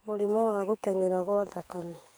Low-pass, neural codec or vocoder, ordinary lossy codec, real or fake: none; codec, 44.1 kHz, 2.6 kbps, SNAC; none; fake